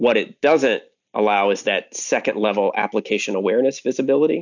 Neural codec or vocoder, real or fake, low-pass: none; real; 7.2 kHz